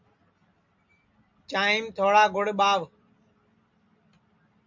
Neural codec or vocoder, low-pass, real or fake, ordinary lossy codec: none; 7.2 kHz; real; MP3, 64 kbps